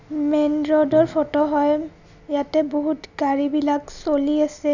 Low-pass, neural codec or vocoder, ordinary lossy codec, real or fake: 7.2 kHz; none; none; real